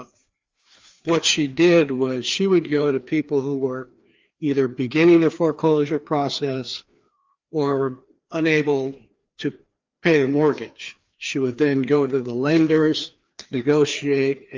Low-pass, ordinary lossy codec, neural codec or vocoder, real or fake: 7.2 kHz; Opus, 32 kbps; codec, 16 kHz, 2 kbps, FreqCodec, larger model; fake